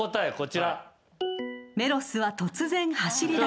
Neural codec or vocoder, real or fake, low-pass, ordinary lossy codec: none; real; none; none